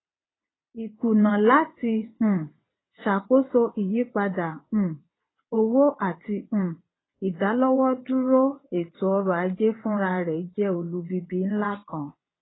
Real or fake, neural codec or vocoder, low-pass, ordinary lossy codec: fake; vocoder, 22.05 kHz, 80 mel bands, WaveNeXt; 7.2 kHz; AAC, 16 kbps